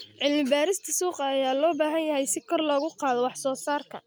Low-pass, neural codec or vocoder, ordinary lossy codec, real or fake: none; none; none; real